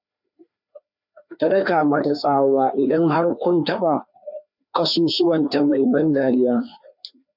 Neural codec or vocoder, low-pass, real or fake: codec, 16 kHz, 2 kbps, FreqCodec, larger model; 5.4 kHz; fake